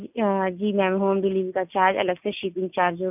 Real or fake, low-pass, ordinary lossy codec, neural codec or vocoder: real; 3.6 kHz; none; none